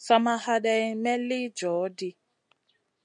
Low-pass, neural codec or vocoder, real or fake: 9.9 kHz; none; real